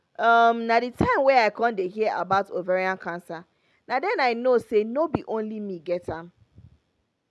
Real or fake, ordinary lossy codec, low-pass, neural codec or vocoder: real; none; none; none